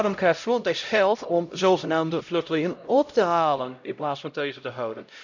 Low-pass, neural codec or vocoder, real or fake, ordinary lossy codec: 7.2 kHz; codec, 16 kHz, 0.5 kbps, X-Codec, HuBERT features, trained on LibriSpeech; fake; none